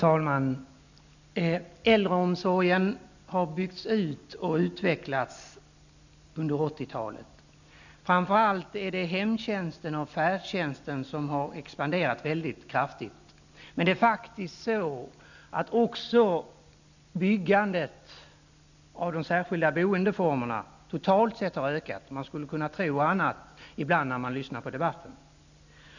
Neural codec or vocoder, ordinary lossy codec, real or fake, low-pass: none; none; real; 7.2 kHz